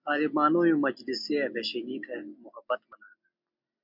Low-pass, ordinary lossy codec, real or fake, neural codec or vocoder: 5.4 kHz; MP3, 48 kbps; real; none